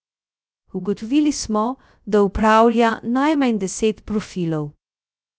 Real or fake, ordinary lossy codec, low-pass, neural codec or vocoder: fake; none; none; codec, 16 kHz, 0.3 kbps, FocalCodec